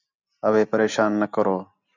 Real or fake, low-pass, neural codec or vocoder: real; 7.2 kHz; none